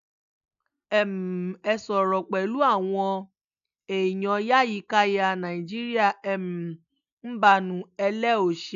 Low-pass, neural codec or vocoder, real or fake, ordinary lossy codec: 7.2 kHz; none; real; none